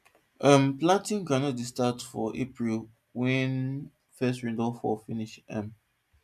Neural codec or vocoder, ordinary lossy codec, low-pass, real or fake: none; none; 14.4 kHz; real